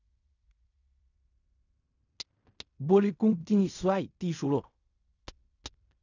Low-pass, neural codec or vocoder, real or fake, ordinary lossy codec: 7.2 kHz; codec, 16 kHz in and 24 kHz out, 0.4 kbps, LongCat-Audio-Codec, fine tuned four codebook decoder; fake; none